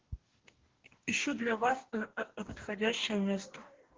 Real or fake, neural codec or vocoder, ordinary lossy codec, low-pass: fake; codec, 44.1 kHz, 2.6 kbps, DAC; Opus, 16 kbps; 7.2 kHz